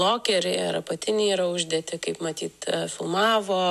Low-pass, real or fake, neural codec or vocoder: 14.4 kHz; real; none